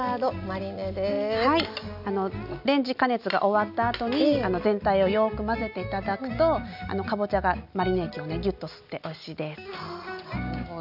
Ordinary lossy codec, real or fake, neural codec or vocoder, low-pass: none; real; none; 5.4 kHz